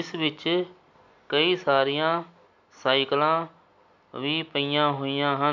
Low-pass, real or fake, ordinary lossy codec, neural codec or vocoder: 7.2 kHz; real; none; none